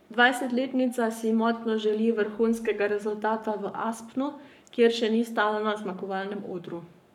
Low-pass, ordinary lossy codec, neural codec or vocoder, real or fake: 19.8 kHz; none; codec, 44.1 kHz, 7.8 kbps, Pupu-Codec; fake